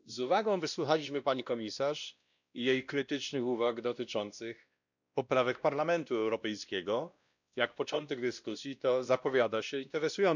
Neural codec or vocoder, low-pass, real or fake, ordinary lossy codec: codec, 16 kHz, 1 kbps, X-Codec, WavLM features, trained on Multilingual LibriSpeech; 7.2 kHz; fake; none